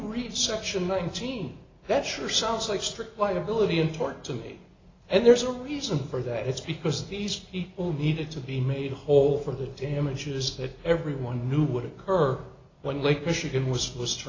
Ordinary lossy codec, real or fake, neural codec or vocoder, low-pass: AAC, 32 kbps; real; none; 7.2 kHz